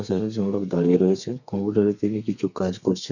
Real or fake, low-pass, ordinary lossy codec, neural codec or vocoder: fake; 7.2 kHz; none; codec, 32 kHz, 1.9 kbps, SNAC